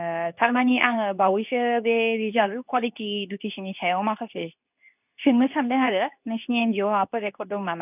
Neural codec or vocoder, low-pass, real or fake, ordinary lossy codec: codec, 24 kHz, 0.9 kbps, WavTokenizer, medium speech release version 2; 3.6 kHz; fake; none